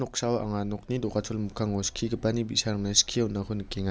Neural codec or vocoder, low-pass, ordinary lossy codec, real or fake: none; none; none; real